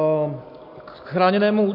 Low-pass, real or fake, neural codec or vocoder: 5.4 kHz; real; none